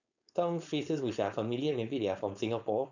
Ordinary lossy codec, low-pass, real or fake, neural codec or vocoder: none; 7.2 kHz; fake; codec, 16 kHz, 4.8 kbps, FACodec